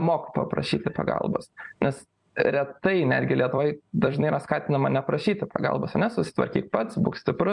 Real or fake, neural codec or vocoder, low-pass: fake; vocoder, 44.1 kHz, 128 mel bands every 256 samples, BigVGAN v2; 10.8 kHz